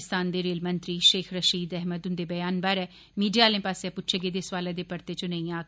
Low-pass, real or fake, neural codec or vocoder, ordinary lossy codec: none; real; none; none